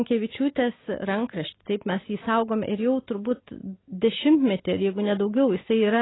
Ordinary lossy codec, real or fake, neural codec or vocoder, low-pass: AAC, 16 kbps; real; none; 7.2 kHz